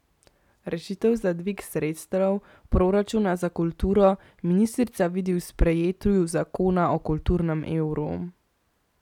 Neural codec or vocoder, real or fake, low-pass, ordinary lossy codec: none; real; 19.8 kHz; none